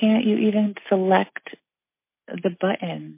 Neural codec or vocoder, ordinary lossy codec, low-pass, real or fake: none; MP3, 24 kbps; 3.6 kHz; real